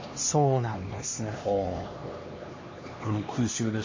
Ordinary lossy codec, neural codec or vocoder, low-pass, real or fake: MP3, 32 kbps; codec, 16 kHz, 2 kbps, X-Codec, HuBERT features, trained on LibriSpeech; 7.2 kHz; fake